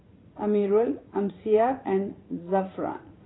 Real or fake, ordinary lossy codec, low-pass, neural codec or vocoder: fake; AAC, 16 kbps; 7.2 kHz; vocoder, 44.1 kHz, 80 mel bands, Vocos